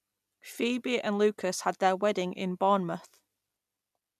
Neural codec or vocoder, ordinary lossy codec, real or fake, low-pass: none; none; real; 14.4 kHz